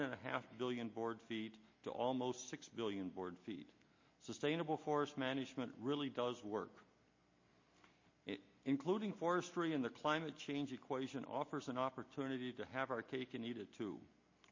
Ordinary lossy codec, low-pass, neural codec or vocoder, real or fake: MP3, 32 kbps; 7.2 kHz; none; real